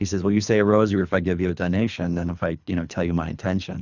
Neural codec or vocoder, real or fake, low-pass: codec, 24 kHz, 3 kbps, HILCodec; fake; 7.2 kHz